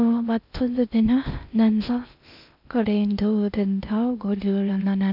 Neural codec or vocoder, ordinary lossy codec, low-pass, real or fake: codec, 16 kHz in and 24 kHz out, 0.6 kbps, FocalCodec, streaming, 2048 codes; none; 5.4 kHz; fake